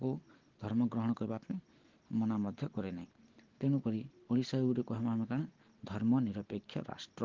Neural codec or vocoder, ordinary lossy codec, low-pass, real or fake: none; Opus, 16 kbps; 7.2 kHz; real